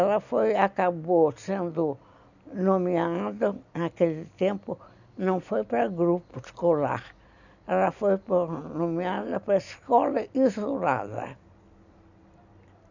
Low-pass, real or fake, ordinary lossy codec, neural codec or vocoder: 7.2 kHz; real; none; none